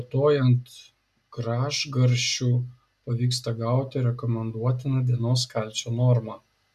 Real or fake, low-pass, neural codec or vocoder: real; 14.4 kHz; none